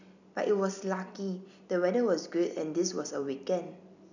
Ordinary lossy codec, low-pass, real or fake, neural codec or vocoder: none; 7.2 kHz; real; none